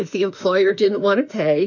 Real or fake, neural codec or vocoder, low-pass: fake; autoencoder, 48 kHz, 32 numbers a frame, DAC-VAE, trained on Japanese speech; 7.2 kHz